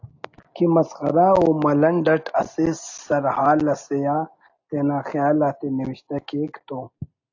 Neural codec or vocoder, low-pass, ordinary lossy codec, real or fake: none; 7.2 kHz; AAC, 48 kbps; real